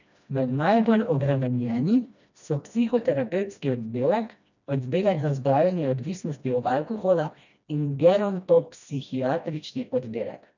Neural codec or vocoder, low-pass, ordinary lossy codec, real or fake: codec, 16 kHz, 1 kbps, FreqCodec, smaller model; 7.2 kHz; none; fake